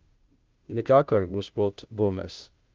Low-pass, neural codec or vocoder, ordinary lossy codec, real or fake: 7.2 kHz; codec, 16 kHz, 0.5 kbps, FunCodec, trained on Chinese and English, 25 frames a second; Opus, 24 kbps; fake